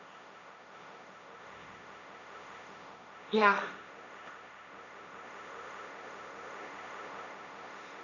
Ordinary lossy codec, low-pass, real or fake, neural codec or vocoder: none; 7.2 kHz; fake; codec, 16 kHz, 1.1 kbps, Voila-Tokenizer